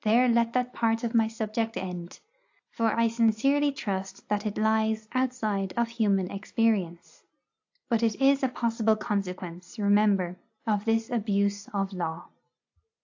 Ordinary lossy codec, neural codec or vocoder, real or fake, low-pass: AAC, 48 kbps; none; real; 7.2 kHz